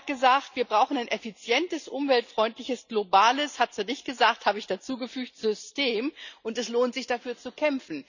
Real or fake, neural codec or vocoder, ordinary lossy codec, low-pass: real; none; none; 7.2 kHz